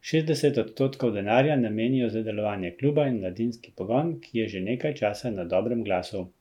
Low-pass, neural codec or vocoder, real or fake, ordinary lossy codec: 19.8 kHz; none; real; MP3, 96 kbps